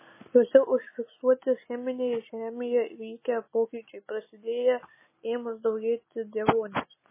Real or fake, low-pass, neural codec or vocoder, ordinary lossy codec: real; 3.6 kHz; none; MP3, 16 kbps